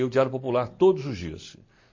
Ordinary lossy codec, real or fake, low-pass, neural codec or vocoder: MP3, 32 kbps; real; 7.2 kHz; none